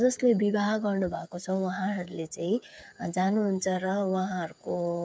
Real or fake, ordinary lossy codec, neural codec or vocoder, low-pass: fake; none; codec, 16 kHz, 8 kbps, FreqCodec, smaller model; none